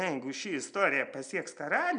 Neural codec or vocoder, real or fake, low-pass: none; real; 9.9 kHz